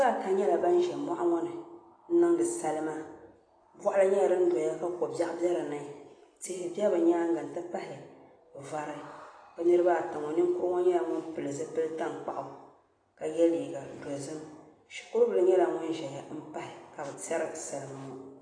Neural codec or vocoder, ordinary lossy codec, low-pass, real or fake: none; AAC, 32 kbps; 9.9 kHz; real